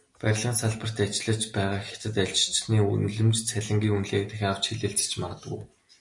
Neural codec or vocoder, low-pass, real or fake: none; 10.8 kHz; real